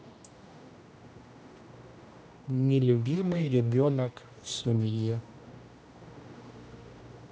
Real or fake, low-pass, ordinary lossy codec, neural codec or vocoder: fake; none; none; codec, 16 kHz, 1 kbps, X-Codec, HuBERT features, trained on general audio